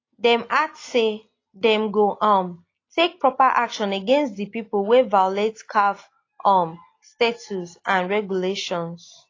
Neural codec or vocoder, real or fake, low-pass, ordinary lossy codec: none; real; 7.2 kHz; AAC, 32 kbps